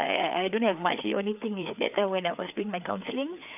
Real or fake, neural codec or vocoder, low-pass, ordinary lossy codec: fake; codec, 16 kHz, 4 kbps, FreqCodec, larger model; 3.6 kHz; none